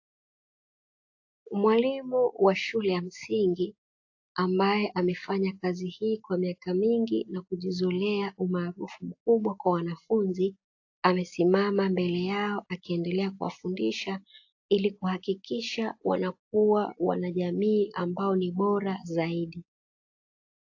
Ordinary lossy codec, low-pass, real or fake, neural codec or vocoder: AAC, 48 kbps; 7.2 kHz; real; none